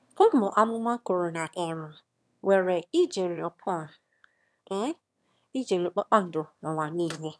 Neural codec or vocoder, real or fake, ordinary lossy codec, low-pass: autoencoder, 22.05 kHz, a latent of 192 numbers a frame, VITS, trained on one speaker; fake; none; none